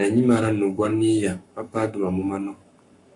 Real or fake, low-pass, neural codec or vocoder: fake; 10.8 kHz; codec, 44.1 kHz, 7.8 kbps, Pupu-Codec